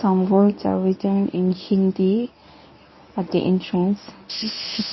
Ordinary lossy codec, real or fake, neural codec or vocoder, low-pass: MP3, 24 kbps; fake; codec, 24 kHz, 0.9 kbps, WavTokenizer, medium speech release version 1; 7.2 kHz